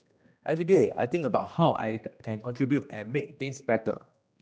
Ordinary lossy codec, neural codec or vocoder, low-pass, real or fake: none; codec, 16 kHz, 1 kbps, X-Codec, HuBERT features, trained on general audio; none; fake